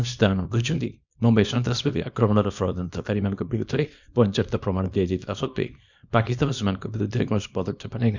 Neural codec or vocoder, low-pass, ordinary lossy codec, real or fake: codec, 24 kHz, 0.9 kbps, WavTokenizer, small release; 7.2 kHz; none; fake